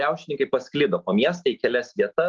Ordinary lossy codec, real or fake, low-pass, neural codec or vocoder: Opus, 32 kbps; real; 7.2 kHz; none